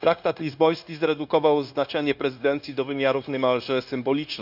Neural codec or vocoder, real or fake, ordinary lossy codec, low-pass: codec, 16 kHz, 0.9 kbps, LongCat-Audio-Codec; fake; none; 5.4 kHz